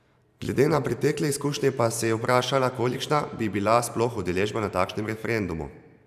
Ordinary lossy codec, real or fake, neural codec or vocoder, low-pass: none; fake; vocoder, 44.1 kHz, 128 mel bands every 512 samples, BigVGAN v2; 14.4 kHz